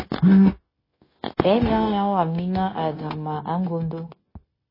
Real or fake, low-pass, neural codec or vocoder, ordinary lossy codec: fake; 5.4 kHz; codec, 16 kHz, 0.9 kbps, LongCat-Audio-Codec; MP3, 24 kbps